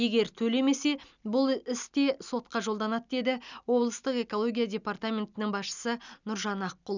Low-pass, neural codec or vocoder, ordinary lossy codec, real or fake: 7.2 kHz; none; none; real